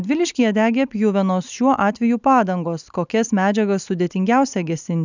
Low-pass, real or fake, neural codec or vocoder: 7.2 kHz; real; none